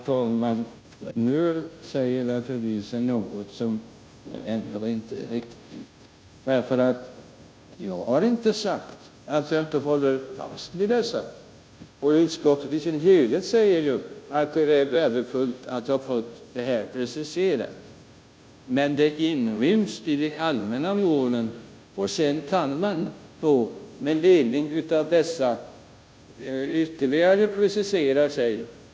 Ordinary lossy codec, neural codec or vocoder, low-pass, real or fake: none; codec, 16 kHz, 0.5 kbps, FunCodec, trained on Chinese and English, 25 frames a second; none; fake